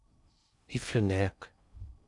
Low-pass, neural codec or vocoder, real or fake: 10.8 kHz; codec, 16 kHz in and 24 kHz out, 0.6 kbps, FocalCodec, streaming, 2048 codes; fake